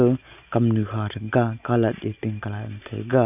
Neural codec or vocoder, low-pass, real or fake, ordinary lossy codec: none; 3.6 kHz; real; none